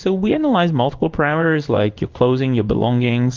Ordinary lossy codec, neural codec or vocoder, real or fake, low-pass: Opus, 24 kbps; codec, 16 kHz in and 24 kHz out, 1 kbps, XY-Tokenizer; fake; 7.2 kHz